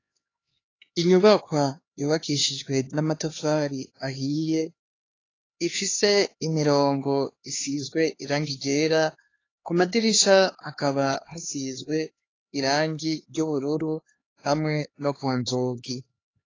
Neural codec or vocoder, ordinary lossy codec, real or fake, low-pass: codec, 16 kHz, 2 kbps, X-Codec, HuBERT features, trained on LibriSpeech; AAC, 32 kbps; fake; 7.2 kHz